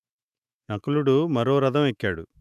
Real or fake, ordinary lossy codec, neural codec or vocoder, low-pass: fake; none; autoencoder, 48 kHz, 128 numbers a frame, DAC-VAE, trained on Japanese speech; 14.4 kHz